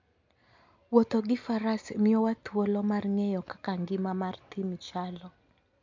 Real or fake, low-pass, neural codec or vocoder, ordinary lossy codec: real; 7.2 kHz; none; MP3, 64 kbps